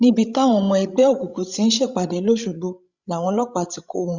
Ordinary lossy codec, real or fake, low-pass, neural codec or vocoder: Opus, 64 kbps; fake; 7.2 kHz; codec, 16 kHz, 16 kbps, FreqCodec, larger model